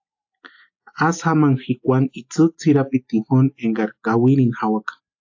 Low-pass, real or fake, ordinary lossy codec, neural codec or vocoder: 7.2 kHz; real; AAC, 48 kbps; none